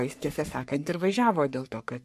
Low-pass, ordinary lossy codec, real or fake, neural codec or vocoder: 14.4 kHz; MP3, 64 kbps; fake; codec, 44.1 kHz, 3.4 kbps, Pupu-Codec